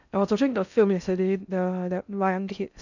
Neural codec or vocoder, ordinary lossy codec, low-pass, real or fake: codec, 16 kHz in and 24 kHz out, 0.6 kbps, FocalCodec, streaming, 4096 codes; none; 7.2 kHz; fake